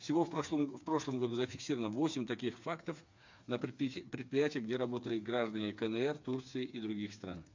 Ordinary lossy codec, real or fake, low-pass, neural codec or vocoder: MP3, 64 kbps; fake; 7.2 kHz; codec, 16 kHz, 4 kbps, FreqCodec, smaller model